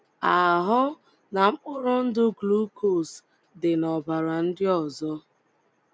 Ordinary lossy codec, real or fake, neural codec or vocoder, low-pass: none; real; none; none